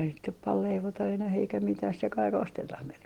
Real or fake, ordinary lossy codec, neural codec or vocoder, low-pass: real; Opus, 16 kbps; none; 19.8 kHz